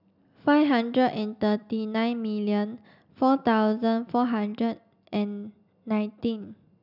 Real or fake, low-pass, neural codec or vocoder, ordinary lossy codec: real; 5.4 kHz; none; none